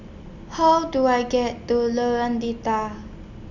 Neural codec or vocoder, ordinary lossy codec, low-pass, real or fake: none; none; 7.2 kHz; real